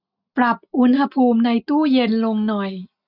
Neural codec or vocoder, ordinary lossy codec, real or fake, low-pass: none; none; real; 5.4 kHz